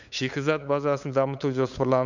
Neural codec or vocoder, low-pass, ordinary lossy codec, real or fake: codec, 16 kHz, 8 kbps, FunCodec, trained on LibriTTS, 25 frames a second; 7.2 kHz; none; fake